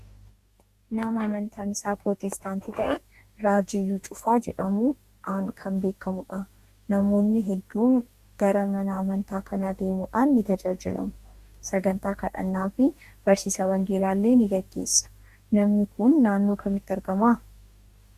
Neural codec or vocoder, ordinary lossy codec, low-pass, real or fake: codec, 44.1 kHz, 2.6 kbps, DAC; Opus, 64 kbps; 14.4 kHz; fake